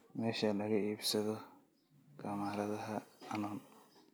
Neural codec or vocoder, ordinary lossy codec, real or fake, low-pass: none; none; real; none